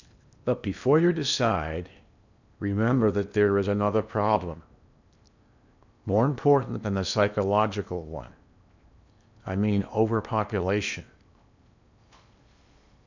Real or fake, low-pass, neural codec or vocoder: fake; 7.2 kHz; codec, 16 kHz in and 24 kHz out, 0.8 kbps, FocalCodec, streaming, 65536 codes